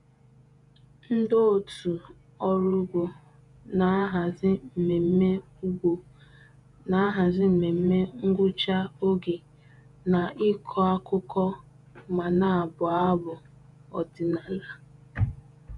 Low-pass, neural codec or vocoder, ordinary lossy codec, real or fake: 10.8 kHz; vocoder, 48 kHz, 128 mel bands, Vocos; none; fake